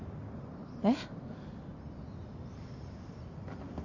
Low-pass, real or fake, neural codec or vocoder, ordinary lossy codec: 7.2 kHz; real; none; none